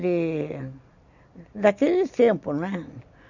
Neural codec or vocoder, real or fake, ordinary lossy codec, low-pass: none; real; none; 7.2 kHz